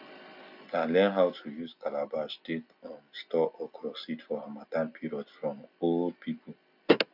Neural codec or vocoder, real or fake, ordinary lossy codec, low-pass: none; real; none; 5.4 kHz